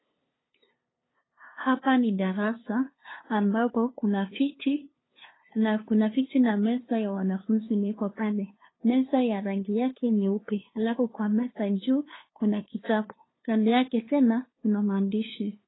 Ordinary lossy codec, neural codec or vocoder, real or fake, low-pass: AAC, 16 kbps; codec, 16 kHz, 2 kbps, FunCodec, trained on LibriTTS, 25 frames a second; fake; 7.2 kHz